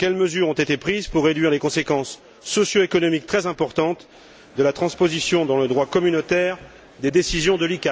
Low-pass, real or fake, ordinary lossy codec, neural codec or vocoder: none; real; none; none